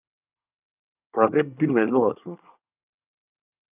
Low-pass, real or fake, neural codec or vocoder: 3.6 kHz; fake; codec, 24 kHz, 1 kbps, SNAC